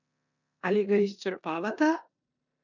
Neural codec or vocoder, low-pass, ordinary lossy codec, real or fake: codec, 16 kHz in and 24 kHz out, 0.9 kbps, LongCat-Audio-Codec, four codebook decoder; 7.2 kHz; none; fake